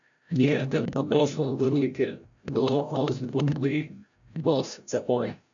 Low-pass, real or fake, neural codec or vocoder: 7.2 kHz; fake; codec, 16 kHz, 0.5 kbps, FreqCodec, larger model